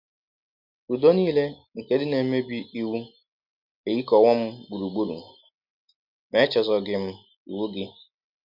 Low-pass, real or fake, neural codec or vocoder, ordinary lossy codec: 5.4 kHz; real; none; MP3, 48 kbps